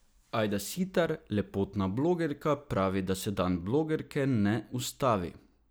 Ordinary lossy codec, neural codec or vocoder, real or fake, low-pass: none; none; real; none